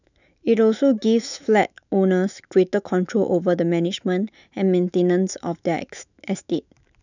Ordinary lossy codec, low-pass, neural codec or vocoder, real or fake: none; 7.2 kHz; autoencoder, 48 kHz, 128 numbers a frame, DAC-VAE, trained on Japanese speech; fake